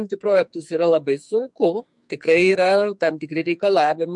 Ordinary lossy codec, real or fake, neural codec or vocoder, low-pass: MP3, 64 kbps; fake; codec, 44.1 kHz, 2.6 kbps, SNAC; 10.8 kHz